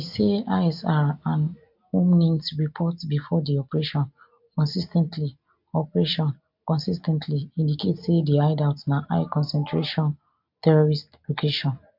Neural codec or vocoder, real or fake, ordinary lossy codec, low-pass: none; real; MP3, 48 kbps; 5.4 kHz